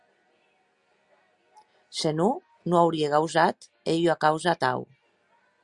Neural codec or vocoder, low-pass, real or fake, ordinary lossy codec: none; 10.8 kHz; real; Opus, 64 kbps